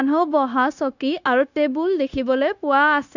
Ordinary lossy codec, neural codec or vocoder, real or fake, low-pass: none; codec, 16 kHz, 0.9 kbps, LongCat-Audio-Codec; fake; 7.2 kHz